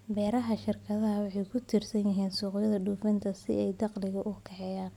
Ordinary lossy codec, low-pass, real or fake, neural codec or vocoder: none; 19.8 kHz; real; none